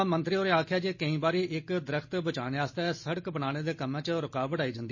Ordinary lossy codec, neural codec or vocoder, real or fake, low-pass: MP3, 32 kbps; none; real; 7.2 kHz